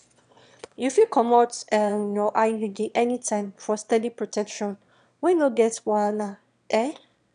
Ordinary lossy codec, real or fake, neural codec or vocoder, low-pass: none; fake; autoencoder, 22.05 kHz, a latent of 192 numbers a frame, VITS, trained on one speaker; 9.9 kHz